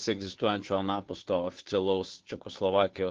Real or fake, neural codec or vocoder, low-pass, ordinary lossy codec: fake; codec, 16 kHz, 2 kbps, FunCodec, trained on Chinese and English, 25 frames a second; 7.2 kHz; Opus, 16 kbps